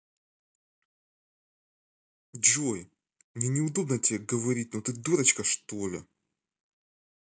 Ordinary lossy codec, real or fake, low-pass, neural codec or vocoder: none; real; none; none